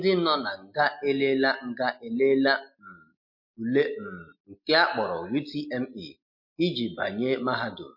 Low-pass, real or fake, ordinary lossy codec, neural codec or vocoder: 5.4 kHz; real; MP3, 32 kbps; none